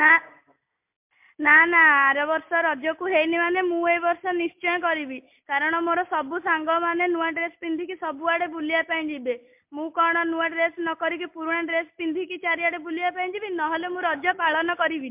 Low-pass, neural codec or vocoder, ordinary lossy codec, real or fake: 3.6 kHz; none; MP3, 32 kbps; real